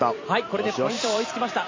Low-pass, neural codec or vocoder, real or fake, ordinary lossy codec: 7.2 kHz; vocoder, 44.1 kHz, 128 mel bands every 256 samples, BigVGAN v2; fake; MP3, 32 kbps